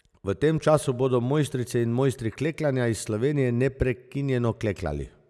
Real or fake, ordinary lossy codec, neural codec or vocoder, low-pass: real; none; none; none